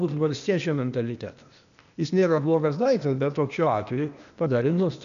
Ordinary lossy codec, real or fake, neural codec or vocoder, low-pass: MP3, 96 kbps; fake; codec, 16 kHz, 0.8 kbps, ZipCodec; 7.2 kHz